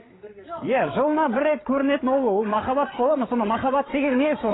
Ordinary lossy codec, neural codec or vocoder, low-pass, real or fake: AAC, 16 kbps; codec, 16 kHz, 8 kbps, FreqCodec, larger model; 7.2 kHz; fake